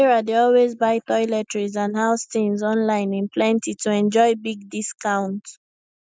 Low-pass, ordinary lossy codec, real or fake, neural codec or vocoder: none; none; real; none